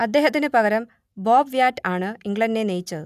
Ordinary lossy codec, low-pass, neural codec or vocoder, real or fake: none; 14.4 kHz; none; real